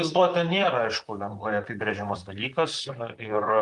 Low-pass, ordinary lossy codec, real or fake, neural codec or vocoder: 10.8 kHz; Opus, 32 kbps; fake; vocoder, 44.1 kHz, 128 mel bands, Pupu-Vocoder